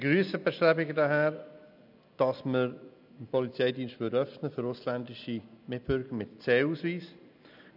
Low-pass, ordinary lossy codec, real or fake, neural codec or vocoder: 5.4 kHz; none; real; none